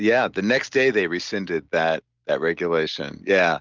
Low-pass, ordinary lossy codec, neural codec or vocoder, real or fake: 7.2 kHz; Opus, 32 kbps; none; real